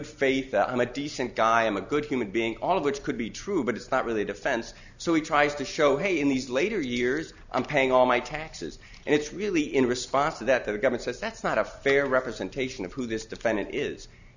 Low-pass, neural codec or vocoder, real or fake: 7.2 kHz; none; real